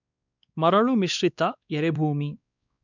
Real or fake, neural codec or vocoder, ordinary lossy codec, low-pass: fake; codec, 16 kHz, 2 kbps, X-Codec, WavLM features, trained on Multilingual LibriSpeech; none; 7.2 kHz